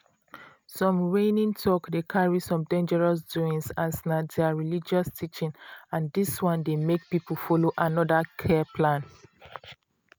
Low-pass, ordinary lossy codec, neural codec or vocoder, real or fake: none; none; none; real